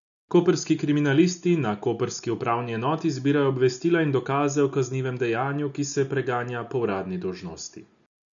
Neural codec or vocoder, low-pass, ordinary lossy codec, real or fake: none; 7.2 kHz; none; real